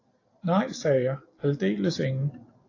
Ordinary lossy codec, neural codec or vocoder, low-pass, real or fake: AAC, 32 kbps; none; 7.2 kHz; real